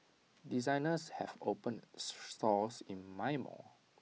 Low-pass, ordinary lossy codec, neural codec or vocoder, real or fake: none; none; none; real